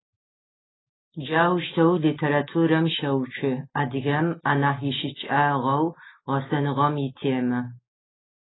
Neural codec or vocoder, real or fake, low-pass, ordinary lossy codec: none; real; 7.2 kHz; AAC, 16 kbps